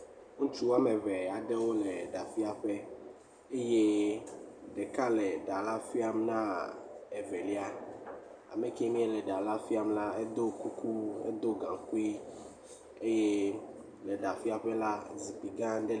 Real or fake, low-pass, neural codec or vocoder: real; 9.9 kHz; none